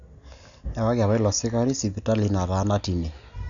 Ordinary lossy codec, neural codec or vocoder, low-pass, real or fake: none; none; 7.2 kHz; real